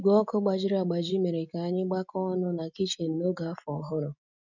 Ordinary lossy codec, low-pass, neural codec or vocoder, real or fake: none; 7.2 kHz; none; real